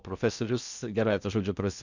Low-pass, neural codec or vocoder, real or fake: 7.2 kHz; codec, 16 kHz in and 24 kHz out, 0.6 kbps, FocalCodec, streaming, 2048 codes; fake